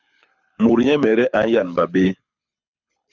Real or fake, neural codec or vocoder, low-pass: fake; codec, 24 kHz, 6 kbps, HILCodec; 7.2 kHz